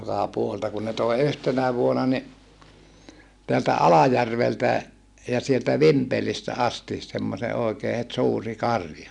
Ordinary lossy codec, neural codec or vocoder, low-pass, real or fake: none; none; 10.8 kHz; real